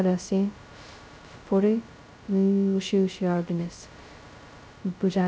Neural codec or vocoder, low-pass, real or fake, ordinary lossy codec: codec, 16 kHz, 0.2 kbps, FocalCodec; none; fake; none